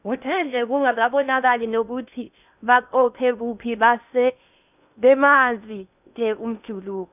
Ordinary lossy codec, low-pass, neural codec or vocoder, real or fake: none; 3.6 kHz; codec, 16 kHz in and 24 kHz out, 0.6 kbps, FocalCodec, streaming, 2048 codes; fake